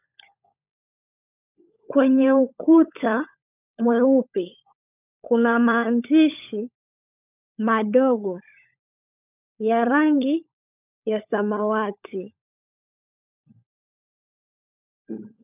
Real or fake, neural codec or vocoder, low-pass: fake; codec, 16 kHz, 16 kbps, FunCodec, trained on LibriTTS, 50 frames a second; 3.6 kHz